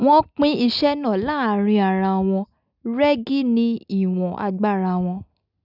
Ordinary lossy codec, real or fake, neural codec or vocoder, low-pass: none; real; none; 5.4 kHz